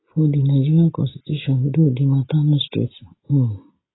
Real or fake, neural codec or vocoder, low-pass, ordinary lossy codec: real; none; 7.2 kHz; AAC, 16 kbps